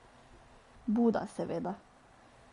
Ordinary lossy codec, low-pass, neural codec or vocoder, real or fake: MP3, 48 kbps; 10.8 kHz; none; real